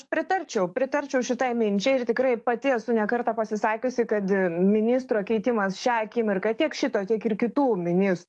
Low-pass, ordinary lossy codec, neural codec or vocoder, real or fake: 10.8 kHz; AAC, 64 kbps; codec, 44.1 kHz, 7.8 kbps, DAC; fake